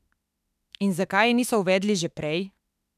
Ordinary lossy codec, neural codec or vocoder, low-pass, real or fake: none; autoencoder, 48 kHz, 32 numbers a frame, DAC-VAE, trained on Japanese speech; 14.4 kHz; fake